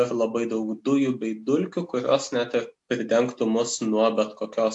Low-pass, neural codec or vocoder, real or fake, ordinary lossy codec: 10.8 kHz; none; real; AAC, 64 kbps